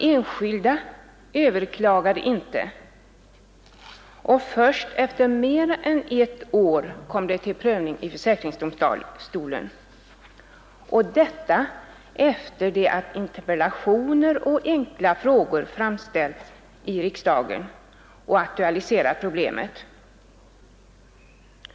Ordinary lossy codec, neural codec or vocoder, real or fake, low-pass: none; none; real; none